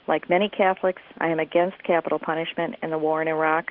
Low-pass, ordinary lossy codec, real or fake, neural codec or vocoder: 5.4 kHz; Opus, 16 kbps; real; none